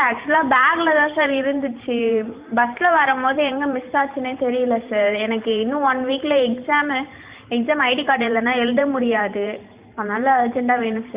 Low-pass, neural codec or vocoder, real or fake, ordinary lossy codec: 3.6 kHz; vocoder, 44.1 kHz, 128 mel bands every 512 samples, BigVGAN v2; fake; Opus, 64 kbps